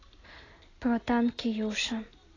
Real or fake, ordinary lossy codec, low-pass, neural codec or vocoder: real; AAC, 32 kbps; 7.2 kHz; none